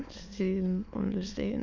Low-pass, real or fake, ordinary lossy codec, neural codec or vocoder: 7.2 kHz; fake; Opus, 64 kbps; autoencoder, 22.05 kHz, a latent of 192 numbers a frame, VITS, trained on many speakers